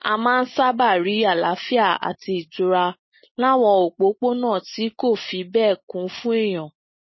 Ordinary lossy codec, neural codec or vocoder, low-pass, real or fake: MP3, 24 kbps; none; 7.2 kHz; real